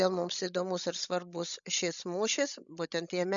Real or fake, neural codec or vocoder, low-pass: fake; codec, 16 kHz, 16 kbps, FreqCodec, larger model; 7.2 kHz